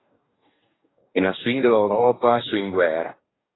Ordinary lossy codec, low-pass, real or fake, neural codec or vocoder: AAC, 16 kbps; 7.2 kHz; fake; codec, 44.1 kHz, 2.6 kbps, DAC